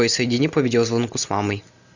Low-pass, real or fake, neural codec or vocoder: 7.2 kHz; real; none